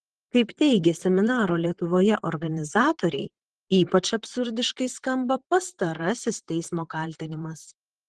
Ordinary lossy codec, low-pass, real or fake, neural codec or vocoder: Opus, 16 kbps; 10.8 kHz; fake; vocoder, 44.1 kHz, 128 mel bands, Pupu-Vocoder